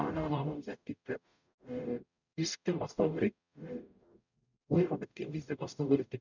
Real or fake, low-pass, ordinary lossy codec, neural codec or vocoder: fake; 7.2 kHz; none; codec, 44.1 kHz, 0.9 kbps, DAC